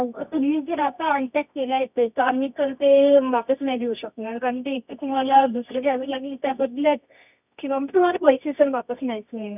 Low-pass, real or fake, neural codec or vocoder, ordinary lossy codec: 3.6 kHz; fake; codec, 24 kHz, 0.9 kbps, WavTokenizer, medium music audio release; none